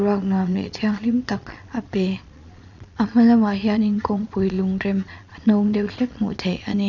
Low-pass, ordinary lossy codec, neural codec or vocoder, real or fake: 7.2 kHz; none; vocoder, 22.05 kHz, 80 mel bands, Vocos; fake